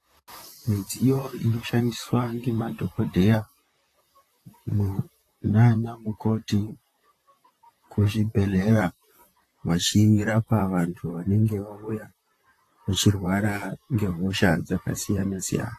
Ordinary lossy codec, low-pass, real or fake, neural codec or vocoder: AAC, 48 kbps; 14.4 kHz; fake; vocoder, 44.1 kHz, 128 mel bands, Pupu-Vocoder